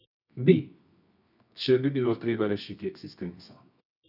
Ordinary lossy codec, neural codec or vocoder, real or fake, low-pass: none; codec, 24 kHz, 0.9 kbps, WavTokenizer, medium music audio release; fake; 5.4 kHz